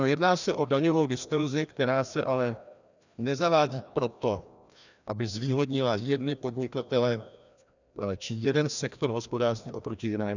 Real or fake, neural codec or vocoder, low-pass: fake; codec, 16 kHz, 1 kbps, FreqCodec, larger model; 7.2 kHz